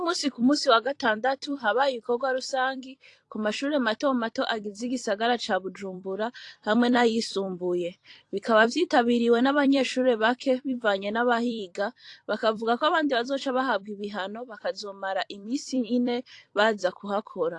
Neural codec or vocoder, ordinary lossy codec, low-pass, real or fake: vocoder, 44.1 kHz, 128 mel bands every 256 samples, BigVGAN v2; AAC, 48 kbps; 10.8 kHz; fake